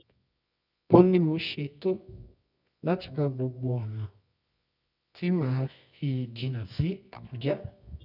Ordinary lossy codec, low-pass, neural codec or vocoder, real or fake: none; 5.4 kHz; codec, 24 kHz, 0.9 kbps, WavTokenizer, medium music audio release; fake